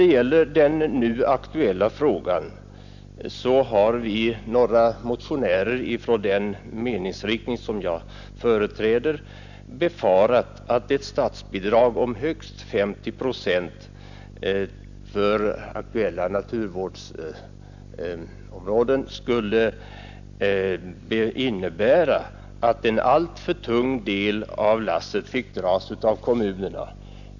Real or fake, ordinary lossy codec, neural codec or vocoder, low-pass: real; none; none; 7.2 kHz